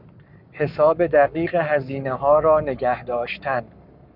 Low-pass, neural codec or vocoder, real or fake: 5.4 kHz; codec, 44.1 kHz, 7.8 kbps, Pupu-Codec; fake